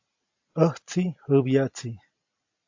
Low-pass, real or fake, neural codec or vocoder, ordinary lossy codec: 7.2 kHz; real; none; AAC, 48 kbps